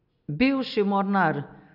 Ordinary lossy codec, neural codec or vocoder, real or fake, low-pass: none; none; real; 5.4 kHz